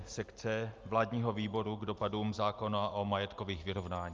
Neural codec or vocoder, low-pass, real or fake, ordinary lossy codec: none; 7.2 kHz; real; Opus, 32 kbps